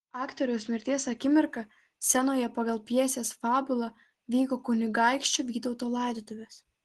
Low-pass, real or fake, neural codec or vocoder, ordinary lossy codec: 10.8 kHz; real; none; Opus, 16 kbps